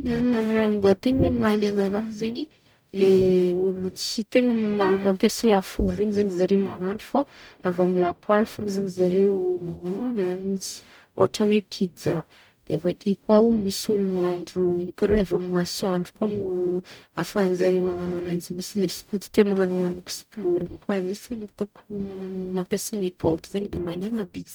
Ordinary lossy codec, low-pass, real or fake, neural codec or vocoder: none; none; fake; codec, 44.1 kHz, 0.9 kbps, DAC